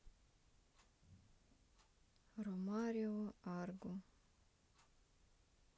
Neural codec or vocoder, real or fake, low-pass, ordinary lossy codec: none; real; none; none